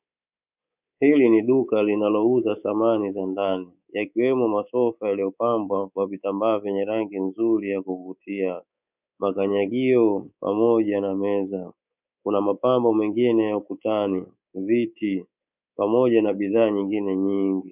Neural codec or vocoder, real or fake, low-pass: codec, 24 kHz, 3.1 kbps, DualCodec; fake; 3.6 kHz